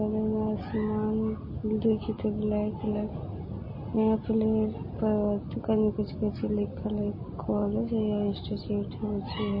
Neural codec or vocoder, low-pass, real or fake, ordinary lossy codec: none; 5.4 kHz; real; MP3, 24 kbps